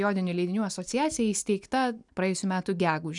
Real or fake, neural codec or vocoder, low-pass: real; none; 10.8 kHz